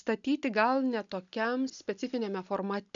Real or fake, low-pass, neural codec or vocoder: real; 7.2 kHz; none